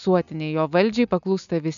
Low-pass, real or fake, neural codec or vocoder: 7.2 kHz; real; none